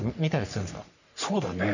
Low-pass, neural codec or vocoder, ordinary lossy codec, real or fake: 7.2 kHz; codec, 44.1 kHz, 3.4 kbps, Pupu-Codec; none; fake